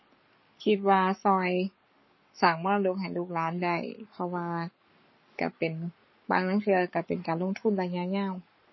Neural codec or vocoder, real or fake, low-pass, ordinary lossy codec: codec, 44.1 kHz, 7.8 kbps, Pupu-Codec; fake; 7.2 kHz; MP3, 24 kbps